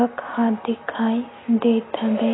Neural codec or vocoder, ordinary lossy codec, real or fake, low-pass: none; AAC, 16 kbps; real; 7.2 kHz